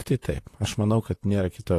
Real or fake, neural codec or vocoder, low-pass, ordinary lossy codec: fake; vocoder, 44.1 kHz, 128 mel bands, Pupu-Vocoder; 14.4 kHz; AAC, 48 kbps